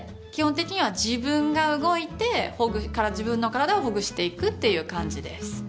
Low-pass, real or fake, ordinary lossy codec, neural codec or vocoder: none; real; none; none